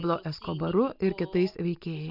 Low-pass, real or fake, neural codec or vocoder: 5.4 kHz; real; none